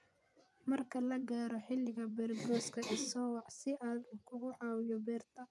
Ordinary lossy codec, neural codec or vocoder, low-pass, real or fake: none; vocoder, 24 kHz, 100 mel bands, Vocos; none; fake